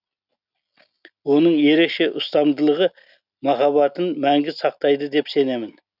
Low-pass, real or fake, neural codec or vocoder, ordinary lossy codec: 5.4 kHz; real; none; none